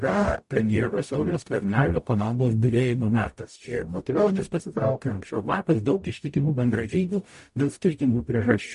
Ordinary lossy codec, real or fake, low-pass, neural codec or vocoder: MP3, 48 kbps; fake; 14.4 kHz; codec, 44.1 kHz, 0.9 kbps, DAC